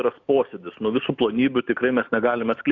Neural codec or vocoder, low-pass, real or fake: none; 7.2 kHz; real